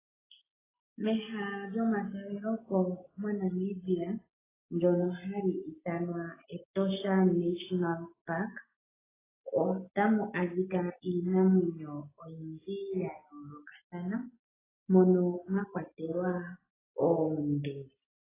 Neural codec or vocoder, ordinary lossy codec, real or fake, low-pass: none; AAC, 16 kbps; real; 3.6 kHz